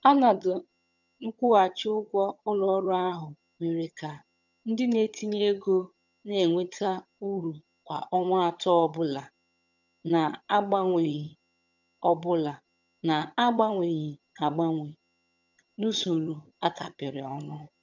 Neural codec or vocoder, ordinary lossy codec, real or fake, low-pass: vocoder, 22.05 kHz, 80 mel bands, HiFi-GAN; none; fake; 7.2 kHz